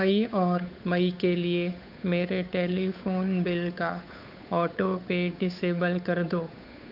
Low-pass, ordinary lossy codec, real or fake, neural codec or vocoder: 5.4 kHz; none; fake; codec, 16 kHz, 8 kbps, FunCodec, trained on Chinese and English, 25 frames a second